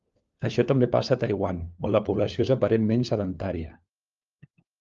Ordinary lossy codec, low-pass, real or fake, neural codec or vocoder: Opus, 32 kbps; 7.2 kHz; fake; codec, 16 kHz, 4 kbps, FunCodec, trained on LibriTTS, 50 frames a second